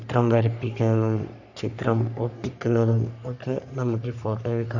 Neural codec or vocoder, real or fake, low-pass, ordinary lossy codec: codec, 44.1 kHz, 3.4 kbps, Pupu-Codec; fake; 7.2 kHz; none